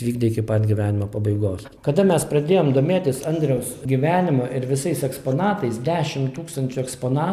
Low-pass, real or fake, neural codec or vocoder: 14.4 kHz; real; none